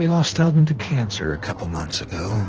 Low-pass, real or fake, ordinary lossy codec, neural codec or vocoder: 7.2 kHz; fake; Opus, 16 kbps; codec, 16 kHz in and 24 kHz out, 1.1 kbps, FireRedTTS-2 codec